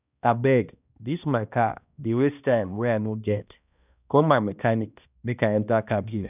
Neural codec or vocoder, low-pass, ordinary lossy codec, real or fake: codec, 16 kHz, 1 kbps, X-Codec, HuBERT features, trained on balanced general audio; 3.6 kHz; none; fake